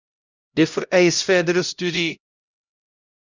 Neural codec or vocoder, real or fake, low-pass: codec, 16 kHz, 0.5 kbps, X-Codec, HuBERT features, trained on LibriSpeech; fake; 7.2 kHz